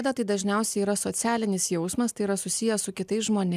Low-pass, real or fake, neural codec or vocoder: 14.4 kHz; real; none